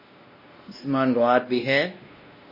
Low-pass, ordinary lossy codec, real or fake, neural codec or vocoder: 5.4 kHz; MP3, 24 kbps; fake; codec, 16 kHz, 1 kbps, X-Codec, WavLM features, trained on Multilingual LibriSpeech